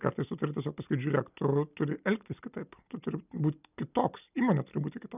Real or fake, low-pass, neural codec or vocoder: real; 3.6 kHz; none